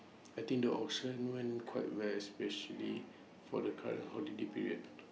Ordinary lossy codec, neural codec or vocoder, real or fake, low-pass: none; none; real; none